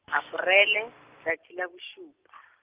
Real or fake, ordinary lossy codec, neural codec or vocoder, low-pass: real; Opus, 32 kbps; none; 3.6 kHz